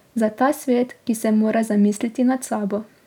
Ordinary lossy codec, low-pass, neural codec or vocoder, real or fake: none; 19.8 kHz; none; real